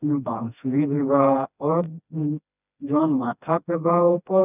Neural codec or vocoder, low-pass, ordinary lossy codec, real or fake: codec, 16 kHz, 1 kbps, FreqCodec, smaller model; 3.6 kHz; none; fake